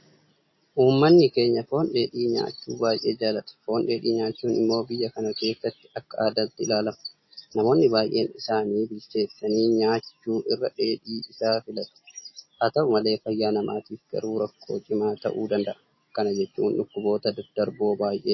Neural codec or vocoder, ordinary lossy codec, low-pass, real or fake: none; MP3, 24 kbps; 7.2 kHz; real